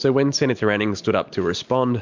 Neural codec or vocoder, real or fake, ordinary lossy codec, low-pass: none; real; MP3, 64 kbps; 7.2 kHz